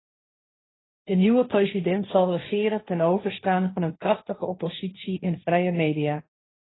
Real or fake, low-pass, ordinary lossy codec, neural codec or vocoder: fake; 7.2 kHz; AAC, 16 kbps; codec, 16 kHz, 1.1 kbps, Voila-Tokenizer